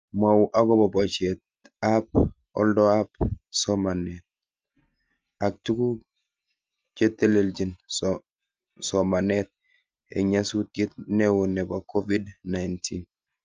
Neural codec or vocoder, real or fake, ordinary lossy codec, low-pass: none; real; Opus, 24 kbps; 7.2 kHz